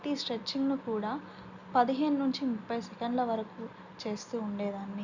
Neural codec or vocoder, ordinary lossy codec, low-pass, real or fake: none; Opus, 64 kbps; 7.2 kHz; real